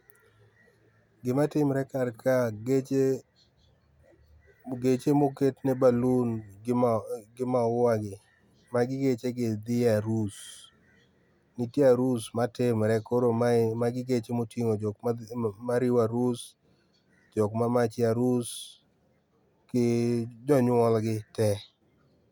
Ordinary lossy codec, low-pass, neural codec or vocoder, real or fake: none; 19.8 kHz; none; real